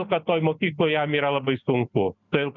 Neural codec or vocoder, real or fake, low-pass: none; real; 7.2 kHz